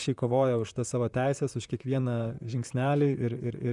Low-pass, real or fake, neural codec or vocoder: 10.8 kHz; fake; vocoder, 44.1 kHz, 128 mel bands, Pupu-Vocoder